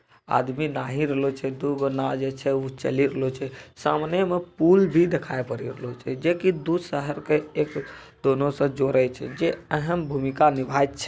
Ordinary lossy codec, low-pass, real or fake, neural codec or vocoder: none; none; real; none